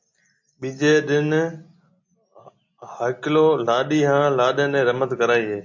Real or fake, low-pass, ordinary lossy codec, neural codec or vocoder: real; 7.2 kHz; MP3, 48 kbps; none